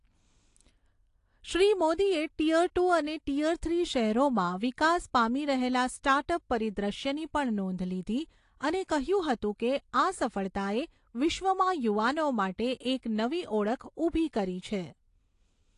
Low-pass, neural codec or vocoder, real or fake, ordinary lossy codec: 10.8 kHz; none; real; AAC, 48 kbps